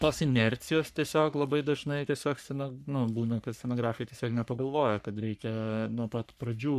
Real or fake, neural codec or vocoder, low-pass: fake; codec, 44.1 kHz, 3.4 kbps, Pupu-Codec; 14.4 kHz